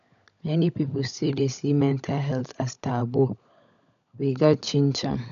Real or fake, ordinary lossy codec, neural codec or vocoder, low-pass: fake; none; codec, 16 kHz, 16 kbps, FunCodec, trained on LibriTTS, 50 frames a second; 7.2 kHz